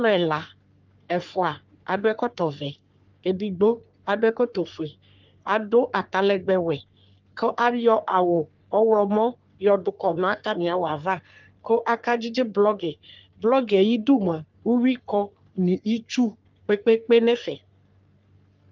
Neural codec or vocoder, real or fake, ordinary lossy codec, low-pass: codec, 44.1 kHz, 3.4 kbps, Pupu-Codec; fake; Opus, 24 kbps; 7.2 kHz